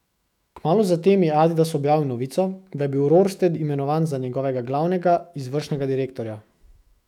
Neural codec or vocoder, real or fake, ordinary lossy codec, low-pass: autoencoder, 48 kHz, 128 numbers a frame, DAC-VAE, trained on Japanese speech; fake; none; 19.8 kHz